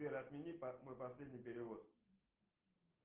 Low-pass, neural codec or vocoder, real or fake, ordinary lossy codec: 3.6 kHz; none; real; Opus, 16 kbps